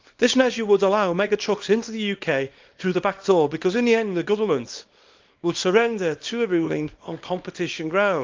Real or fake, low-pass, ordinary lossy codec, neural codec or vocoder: fake; 7.2 kHz; Opus, 32 kbps; codec, 24 kHz, 0.9 kbps, WavTokenizer, small release